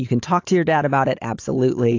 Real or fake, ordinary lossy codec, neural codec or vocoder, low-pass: real; AAC, 48 kbps; none; 7.2 kHz